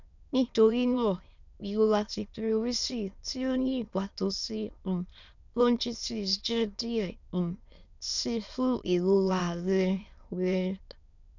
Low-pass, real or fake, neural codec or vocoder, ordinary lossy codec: 7.2 kHz; fake; autoencoder, 22.05 kHz, a latent of 192 numbers a frame, VITS, trained on many speakers; none